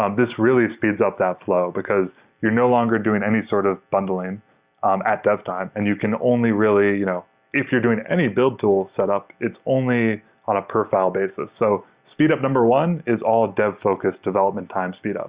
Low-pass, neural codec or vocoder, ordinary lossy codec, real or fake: 3.6 kHz; none; Opus, 64 kbps; real